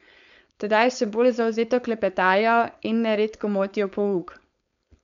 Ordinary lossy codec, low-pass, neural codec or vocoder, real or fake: none; 7.2 kHz; codec, 16 kHz, 4.8 kbps, FACodec; fake